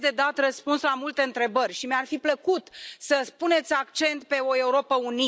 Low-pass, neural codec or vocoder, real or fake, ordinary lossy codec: none; none; real; none